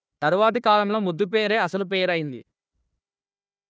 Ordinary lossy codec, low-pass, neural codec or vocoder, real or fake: none; none; codec, 16 kHz, 1 kbps, FunCodec, trained on Chinese and English, 50 frames a second; fake